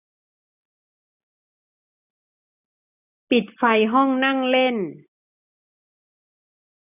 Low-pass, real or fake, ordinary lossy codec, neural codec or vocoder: 3.6 kHz; real; none; none